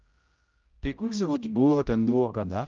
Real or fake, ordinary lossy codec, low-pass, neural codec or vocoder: fake; Opus, 32 kbps; 7.2 kHz; codec, 16 kHz, 0.5 kbps, X-Codec, HuBERT features, trained on general audio